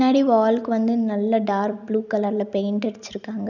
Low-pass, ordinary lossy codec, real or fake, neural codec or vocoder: 7.2 kHz; none; real; none